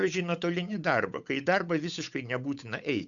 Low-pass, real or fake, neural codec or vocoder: 7.2 kHz; fake; codec, 16 kHz, 8 kbps, FunCodec, trained on Chinese and English, 25 frames a second